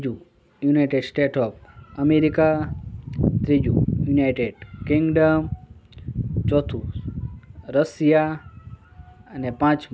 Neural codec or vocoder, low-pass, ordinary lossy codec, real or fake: none; none; none; real